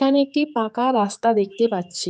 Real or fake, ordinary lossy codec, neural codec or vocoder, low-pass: fake; none; codec, 16 kHz, 4 kbps, X-Codec, HuBERT features, trained on general audio; none